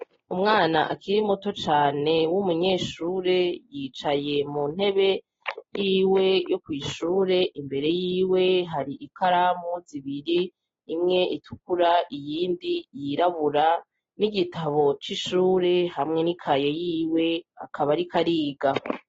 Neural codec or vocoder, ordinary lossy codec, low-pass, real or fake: none; AAC, 24 kbps; 7.2 kHz; real